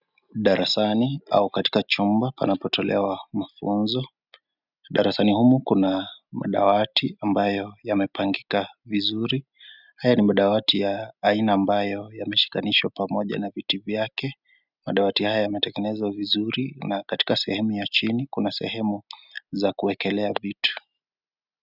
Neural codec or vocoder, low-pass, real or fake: none; 5.4 kHz; real